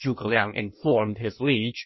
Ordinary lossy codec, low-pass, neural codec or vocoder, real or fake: MP3, 24 kbps; 7.2 kHz; codec, 16 kHz in and 24 kHz out, 1.1 kbps, FireRedTTS-2 codec; fake